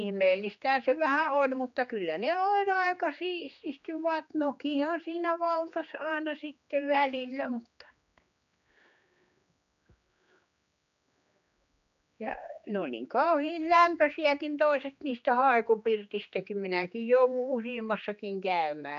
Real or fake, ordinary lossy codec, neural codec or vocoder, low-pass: fake; none; codec, 16 kHz, 2 kbps, X-Codec, HuBERT features, trained on general audio; 7.2 kHz